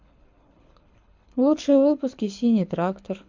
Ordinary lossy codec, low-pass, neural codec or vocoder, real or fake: MP3, 64 kbps; 7.2 kHz; codec, 24 kHz, 6 kbps, HILCodec; fake